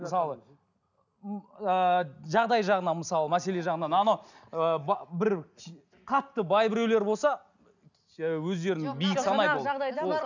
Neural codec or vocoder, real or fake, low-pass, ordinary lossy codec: none; real; 7.2 kHz; none